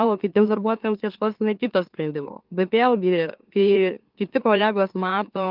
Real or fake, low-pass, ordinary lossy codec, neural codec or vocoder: fake; 5.4 kHz; Opus, 24 kbps; autoencoder, 44.1 kHz, a latent of 192 numbers a frame, MeloTTS